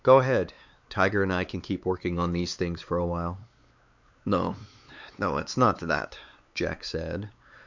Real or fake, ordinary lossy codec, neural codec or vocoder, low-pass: fake; Opus, 64 kbps; codec, 16 kHz, 4 kbps, X-Codec, WavLM features, trained on Multilingual LibriSpeech; 7.2 kHz